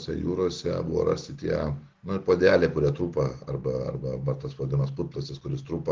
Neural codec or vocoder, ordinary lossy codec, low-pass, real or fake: none; Opus, 16 kbps; 7.2 kHz; real